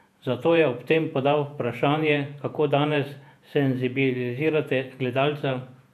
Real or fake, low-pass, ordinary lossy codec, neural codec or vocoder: fake; 14.4 kHz; none; vocoder, 48 kHz, 128 mel bands, Vocos